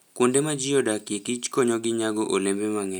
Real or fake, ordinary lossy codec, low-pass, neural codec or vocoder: real; none; none; none